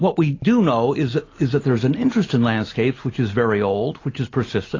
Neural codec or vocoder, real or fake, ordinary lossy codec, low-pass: none; real; AAC, 32 kbps; 7.2 kHz